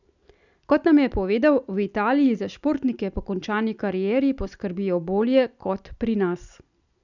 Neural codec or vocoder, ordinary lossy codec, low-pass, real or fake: none; none; 7.2 kHz; real